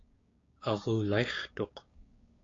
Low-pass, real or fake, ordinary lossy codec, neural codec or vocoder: 7.2 kHz; fake; AAC, 32 kbps; codec, 16 kHz, 6 kbps, DAC